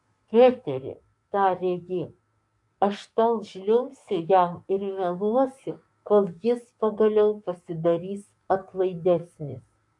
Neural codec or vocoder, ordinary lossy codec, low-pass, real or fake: codec, 44.1 kHz, 7.8 kbps, DAC; MP3, 64 kbps; 10.8 kHz; fake